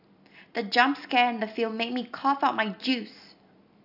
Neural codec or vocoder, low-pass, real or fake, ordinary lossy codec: none; 5.4 kHz; real; AAC, 48 kbps